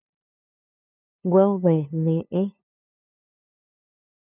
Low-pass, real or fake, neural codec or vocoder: 3.6 kHz; fake; codec, 16 kHz, 2 kbps, FunCodec, trained on LibriTTS, 25 frames a second